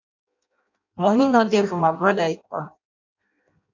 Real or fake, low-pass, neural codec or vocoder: fake; 7.2 kHz; codec, 16 kHz in and 24 kHz out, 0.6 kbps, FireRedTTS-2 codec